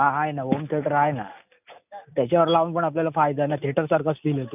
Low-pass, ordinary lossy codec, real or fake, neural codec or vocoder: 3.6 kHz; none; real; none